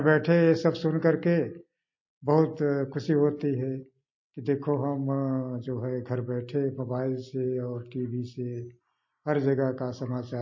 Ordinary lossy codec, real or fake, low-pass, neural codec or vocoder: MP3, 32 kbps; real; 7.2 kHz; none